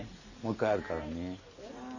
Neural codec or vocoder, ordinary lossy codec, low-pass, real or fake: none; none; 7.2 kHz; real